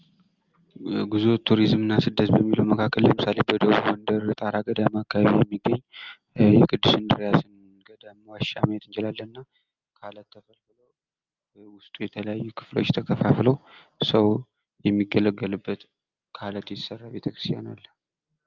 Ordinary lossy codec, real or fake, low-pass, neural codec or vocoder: Opus, 24 kbps; real; 7.2 kHz; none